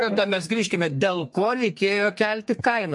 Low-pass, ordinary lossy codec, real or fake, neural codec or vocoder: 10.8 kHz; MP3, 48 kbps; fake; codec, 32 kHz, 1.9 kbps, SNAC